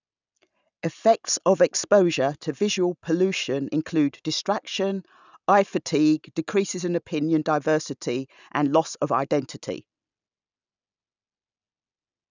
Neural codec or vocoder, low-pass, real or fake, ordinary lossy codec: none; 7.2 kHz; real; none